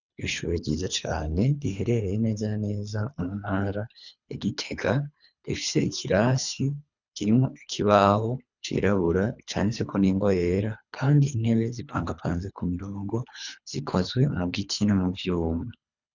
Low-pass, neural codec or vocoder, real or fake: 7.2 kHz; codec, 24 kHz, 3 kbps, HILCodec; fake